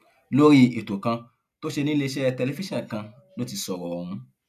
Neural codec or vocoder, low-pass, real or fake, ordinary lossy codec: none; 14.4 kHz; real; none